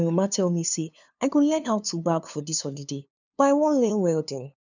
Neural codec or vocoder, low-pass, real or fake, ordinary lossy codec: codec, 16 kHz, 2 kbps, FunCodec, trained on LibriTTS, 25 frames a second; 7.2 kHz; fake; none